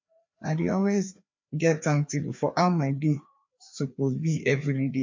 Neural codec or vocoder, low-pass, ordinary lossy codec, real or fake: codec, 16 kHz, 2 kbps, FreqCodec, larger model; 7.2 kHz; MP3, 48 kbps; fake